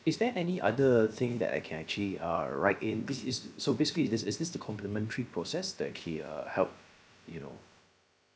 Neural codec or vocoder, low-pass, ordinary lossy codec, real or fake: codec, 16 kHz, about 1 kbps, DyCAST, with the encoder's durations; none; none; fake